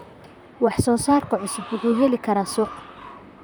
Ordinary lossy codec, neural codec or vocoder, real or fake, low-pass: none; vocoder, 44.1 kHz, 128 mel bands, Pupu-Vocoder; fake; none